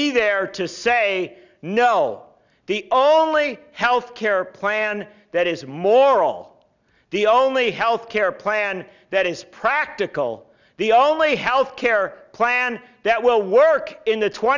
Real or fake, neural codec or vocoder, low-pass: real; none; 7.2 kHz